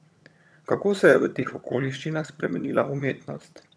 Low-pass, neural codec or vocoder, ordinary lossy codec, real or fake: none; vocoder, 22.05 kHz, 80 mel bands, HiFi-GAN; none; fake